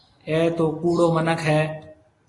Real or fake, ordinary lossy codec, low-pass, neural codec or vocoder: real; AAC, 32 kbps; 10.8 kHz; none